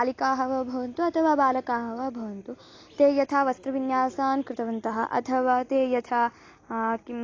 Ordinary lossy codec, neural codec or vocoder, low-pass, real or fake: AAC, 32 kbps; none; 7.2 kHz; real